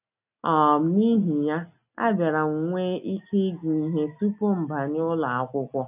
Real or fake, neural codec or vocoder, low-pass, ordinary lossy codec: real; none; 3.6 kHz; none